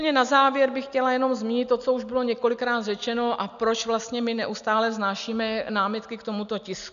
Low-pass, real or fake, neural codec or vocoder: 7.2 kHz; real; none